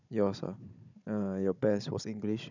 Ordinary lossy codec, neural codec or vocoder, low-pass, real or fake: none; codec, 16 kHz, 16 kbps, FunCodec, trained on Chinese and English, 50 frames a second; 7.2 kHz; fake